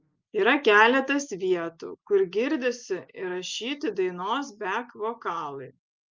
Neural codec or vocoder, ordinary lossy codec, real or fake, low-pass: none; Opus, 24 kbps; real; 7.2 kHz